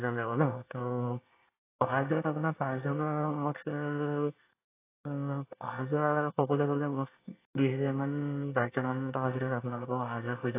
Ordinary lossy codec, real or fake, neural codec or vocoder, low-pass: AAC, 24 kbps; fake; codec, 24 kHz, 1 kbps, SNAC; 3.6 kHz